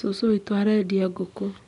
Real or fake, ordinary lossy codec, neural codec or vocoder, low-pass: real; none; none; 10.8 kHz